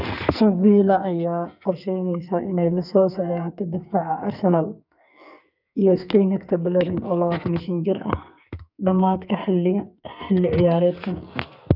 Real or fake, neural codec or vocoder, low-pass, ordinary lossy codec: fake; codec, 44.1 kHz, 2.6 kbps, SNAC; 5.4 kHz; none